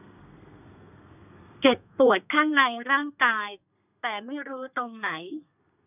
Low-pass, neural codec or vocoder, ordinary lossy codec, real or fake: 3.6 kHz; codec, 32 kHz, 1.9 kbps, SNAC; none; fake